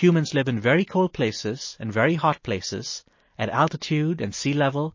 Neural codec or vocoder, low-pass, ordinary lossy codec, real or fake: none; 7.2 kHz; MP3, 32 kbps; real